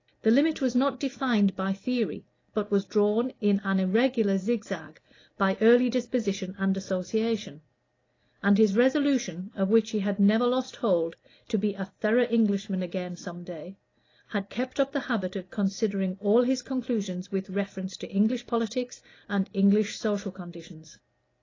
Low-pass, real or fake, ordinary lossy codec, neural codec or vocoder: 7.2 kHz; real; AAC, 32 kbps; none